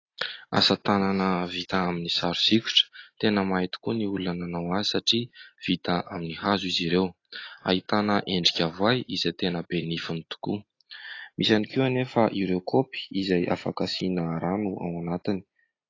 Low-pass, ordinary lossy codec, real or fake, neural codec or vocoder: 7.2 kHz; AAC, 32 kbps; real; none